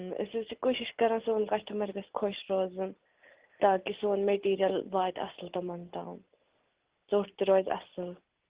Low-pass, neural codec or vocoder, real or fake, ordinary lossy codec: 3.6 kHz; none; real; Opus, 16 kbps